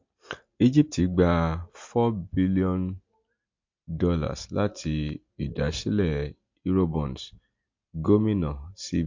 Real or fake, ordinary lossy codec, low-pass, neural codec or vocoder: real; MP3, 48 kbps; 7.2 kHz; none